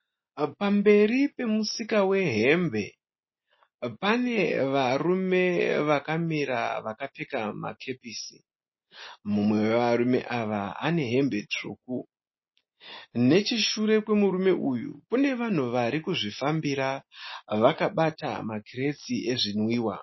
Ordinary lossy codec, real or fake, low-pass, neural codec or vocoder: MP3, 24 kbps; real; 7.2 kHz; none